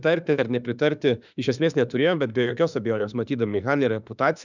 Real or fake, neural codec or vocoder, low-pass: fake; autoencoder, 48 kHz, 32 numbers a frame, DAC-VAE, trained on Japanese speech; 7.2 kHz